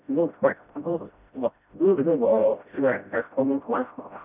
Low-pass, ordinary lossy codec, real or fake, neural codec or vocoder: 3.6 kHz; none; fake; codec, 16 kHz, 0.5 kbps, FreqCodec, smaller model